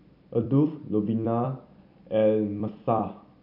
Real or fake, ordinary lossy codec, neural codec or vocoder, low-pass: fake; none; vocoder, 44.1 kHz, 128 mel bands every 256 samples, BigVGAN v2; 5.4 kHz